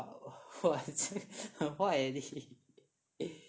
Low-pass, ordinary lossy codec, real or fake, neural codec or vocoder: none; none; real; none